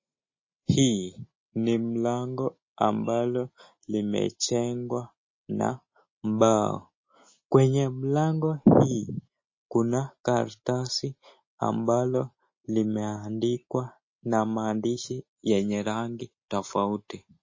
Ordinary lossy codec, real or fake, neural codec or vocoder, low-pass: MP3, 32 kbps; real; none; 7.2 kHz